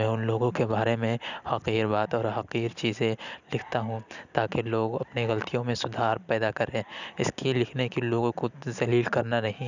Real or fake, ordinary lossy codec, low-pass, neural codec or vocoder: real; none; 7.2 kHz; none